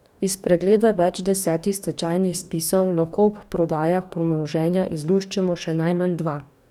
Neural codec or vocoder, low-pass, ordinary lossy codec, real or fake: codec, 44.1 kHz, 2.6 kbps, DAC; 19.8 kHz; none; fake